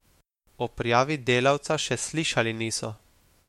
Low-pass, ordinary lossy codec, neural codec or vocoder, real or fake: 19.8 kHz; MP3, 64 kbps; autoencoder, 48 kHz, 128 numbers a frame, DAC-VAE, trained on Japanese speech; fake